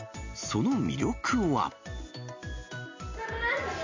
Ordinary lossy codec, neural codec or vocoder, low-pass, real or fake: MP3, 64 kbps; none; 7.2 kHz; real